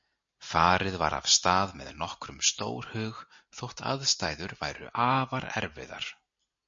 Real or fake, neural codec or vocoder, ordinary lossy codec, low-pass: real; none; MP3, 48 kbps; 7.2 kHz